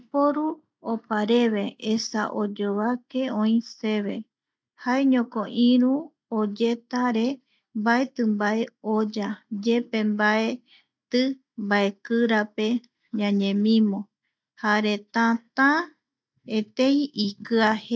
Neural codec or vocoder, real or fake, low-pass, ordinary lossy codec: none; real; none; none